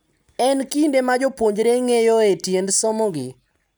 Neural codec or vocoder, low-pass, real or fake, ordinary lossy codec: none; none; real; none